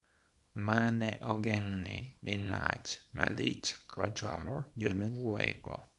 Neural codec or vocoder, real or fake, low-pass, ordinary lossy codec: codec, 24 kHz, 0.9 kbps, WavTokenizer, small release; fake; 10.8 kHz; MP3, 96 kbps